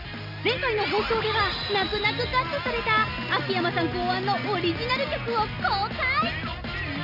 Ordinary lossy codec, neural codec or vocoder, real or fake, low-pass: none; none; real; 5.4 kHz